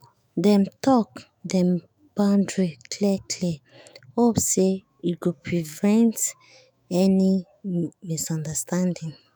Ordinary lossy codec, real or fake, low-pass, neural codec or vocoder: none; fake; none; autoencoder, 48 kHz, 128 numbers a frame, DAC-VAE, trained on Japanese speech